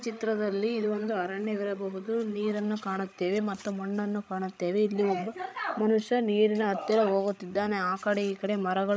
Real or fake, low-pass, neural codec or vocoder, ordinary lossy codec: fake; none; codec, 16 kHz, 16 kbps, FreqCodec, larger model; none